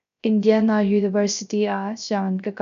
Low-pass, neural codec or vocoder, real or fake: 7.2 kHz; codec, 16 kHz, 0.3 kbps, FocalCodec; fake